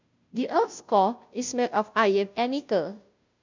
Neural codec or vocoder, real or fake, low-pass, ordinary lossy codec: codec, 16 kHz, 0.5 kbps, FunCodec, trained on Chinese and English, 25 frames a second; fake; 7.2 kHz; MP3, 64 kbps